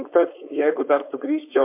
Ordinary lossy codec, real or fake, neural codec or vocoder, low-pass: MP3, 32 kbps; fake; codec, 16 kHz, 4.8 kbps, FACodec; 3.6 kHz